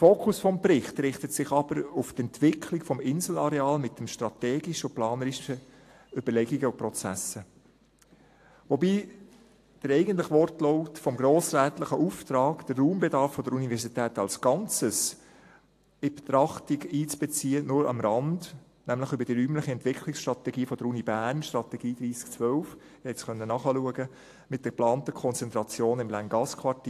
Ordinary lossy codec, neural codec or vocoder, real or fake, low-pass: AAC, 64 kbps; none; real; 14.4 kHz